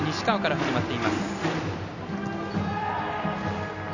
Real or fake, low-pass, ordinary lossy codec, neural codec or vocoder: real; 7.2 kHz; none; none